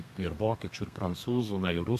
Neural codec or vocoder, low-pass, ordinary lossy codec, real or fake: codec, 32 kHz, 1.9 kbps, SNAC; 14.4 kHz; MP3, 96 kbps; fake